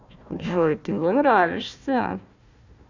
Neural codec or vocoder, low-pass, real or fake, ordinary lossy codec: codec, 16 kHz, 1 kbps, FunCodec, trained on Chinese and English, 50 frames a second; 7.2 kHz; fake; none